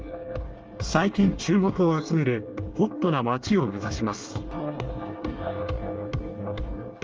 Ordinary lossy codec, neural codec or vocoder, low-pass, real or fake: Opus, 24 kbps; codec, 24 kHz, 1 kbps, SNAC; 7.2 kHz; fake